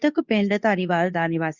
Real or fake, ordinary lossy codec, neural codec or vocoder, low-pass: fake; Opus, 64 kbps; codec, 24 kHz, 0.9 kbps, WavTokenizer, medium speech release version 2; 7.2 kHz